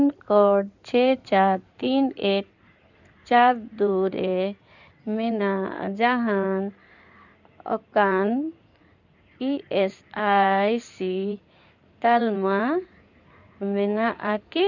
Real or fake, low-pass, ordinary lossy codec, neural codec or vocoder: fake; 7.2 kHz; none; codec, 16 kHz in and 24 kHz out, 2.2 kbps, FireRedTTS-2 codec